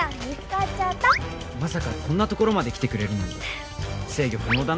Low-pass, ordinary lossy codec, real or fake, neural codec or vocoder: none; none; real; none